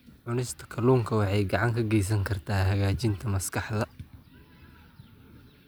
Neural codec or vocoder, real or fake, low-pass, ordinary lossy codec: none; real; none; none